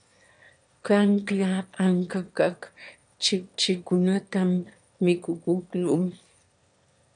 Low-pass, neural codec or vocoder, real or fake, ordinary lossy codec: 9.9 kHz; autoencoder, 22.05 kHz, a latent of 192 numbers a frame, VITS, trained on one speaker; fake; MP3, 96 kbps